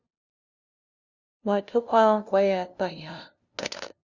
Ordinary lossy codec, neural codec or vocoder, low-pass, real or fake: Opus, 64 kbps; codec, 16 kHz, 0.5 kbps, FunCodec, trained on LibriTTS, 25 frames a second; 7.2 kHz; fake